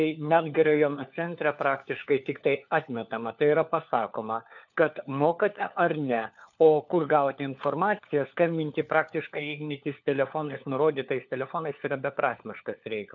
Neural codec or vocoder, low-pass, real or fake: codec, 16 kHz, 4 kbps, FunCodec, trained on LibriTTS, 50 frames a second; 7.2 kHz; fake